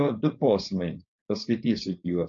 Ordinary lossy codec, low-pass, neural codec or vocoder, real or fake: MP3, 48 kbps; 7.2 kHz; codec, 16 kHz, 4.8 kbps, FACodec; fake